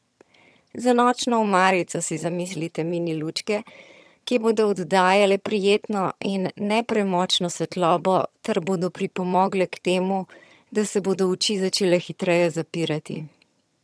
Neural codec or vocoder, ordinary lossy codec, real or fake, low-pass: vocoder, 22.05 kHz, 80 mel bands, HiFi-GAN; none; fake; none